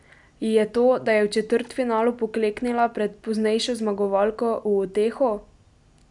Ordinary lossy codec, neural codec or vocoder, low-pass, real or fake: none; none; 10.8 kHz; real